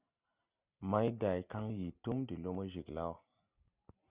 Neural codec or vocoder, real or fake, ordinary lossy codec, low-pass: none; real; Opus, 64 kbps; 3.6 kHz